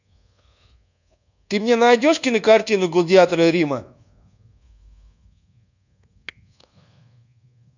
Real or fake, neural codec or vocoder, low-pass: fake; codec, 24 kHz, 1.2 kbps, DualCodec; 7.2 kHz